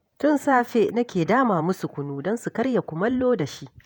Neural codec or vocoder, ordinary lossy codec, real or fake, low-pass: vocoder, 48 kHz, 128 mel bands, Vocos; none; fake; none